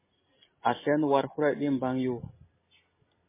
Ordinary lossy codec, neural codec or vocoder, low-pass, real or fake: MP3, 16 kbps; none; 3.6 kHz; real